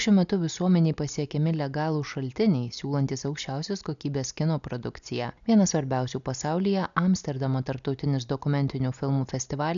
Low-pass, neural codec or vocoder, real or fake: 7.2 kHz; none; real